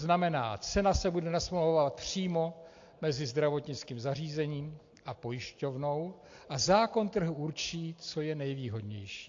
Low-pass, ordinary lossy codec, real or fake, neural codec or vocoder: 7.2 kHz; AAC, 48 kbps; real; none